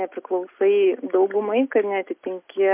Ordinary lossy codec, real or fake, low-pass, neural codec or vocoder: MP3, 32 kbps; real; 3.6 kHz; none